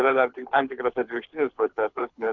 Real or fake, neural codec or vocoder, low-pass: fake; codec, 24 kHz, 6 kbps, HILCodec; 7.2 kHz